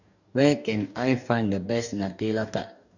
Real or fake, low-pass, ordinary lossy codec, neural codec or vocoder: fake; 7.2 kHz; none; codec, 44.1 kHz, 2.6 kbps, DAC